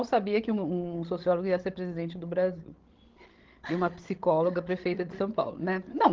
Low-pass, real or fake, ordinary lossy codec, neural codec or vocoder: 7.2 kHz; fake; Opus, 16 kbps; codec, 16 kHz, 16 kbps, FreqCodec, larger model